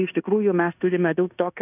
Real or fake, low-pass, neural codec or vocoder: fake; 3.6 kHz; codec, 16 kHz in and 24 kHz out, 0.9 kbps, LongCat-Audio-Codec, fine tuned four codebook decoder